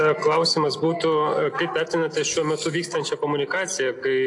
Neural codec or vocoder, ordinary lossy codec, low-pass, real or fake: none; AAC, 48 kbps; 10.8 kHz; real